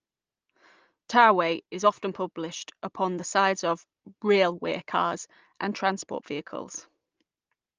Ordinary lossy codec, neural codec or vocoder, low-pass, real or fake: Opus, 24 kbps; none; 7.2 kHz; real